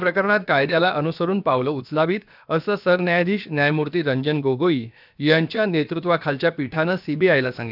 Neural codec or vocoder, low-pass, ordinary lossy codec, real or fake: codec, 16 kHz, about 1 kbps, DyCAST, with the encoder's durations; 5.4 kHz; none; fake